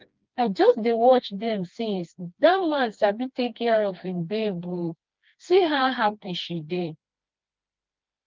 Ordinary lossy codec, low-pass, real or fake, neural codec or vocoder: Opus, 24 kbps; 7.2 kHz; fake; codec, 16 kHz, 2 kbps, FreqCodec, smaller model